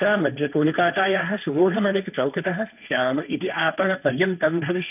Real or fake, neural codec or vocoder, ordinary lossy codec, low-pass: fake; codec, 16 kHz, 1.1 kbps, Voila-Tokenizer; none; 3.6 kHz